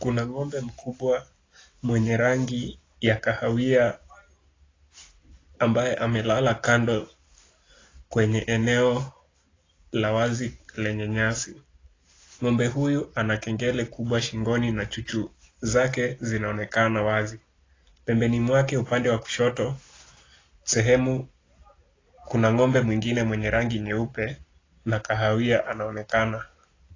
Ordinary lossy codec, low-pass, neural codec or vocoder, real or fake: AAC, 32 kbps; 7.2 kHz; none; real